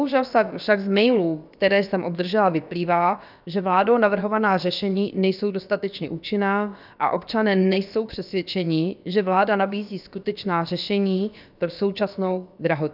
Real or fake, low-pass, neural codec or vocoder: fake; 5.4 kHz; codec, 16 kHz, about 1 kbps, DyCAST, with the encoder's durations